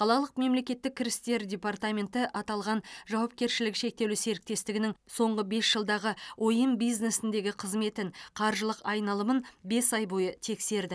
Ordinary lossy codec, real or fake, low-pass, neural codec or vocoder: none; real; none; none